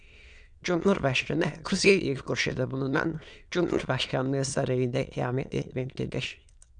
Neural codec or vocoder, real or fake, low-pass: autoencoder, 22.05 kHz, a latent of 192 numbers a frame, VITS, trained on many speakers; fake; 9.9 kHz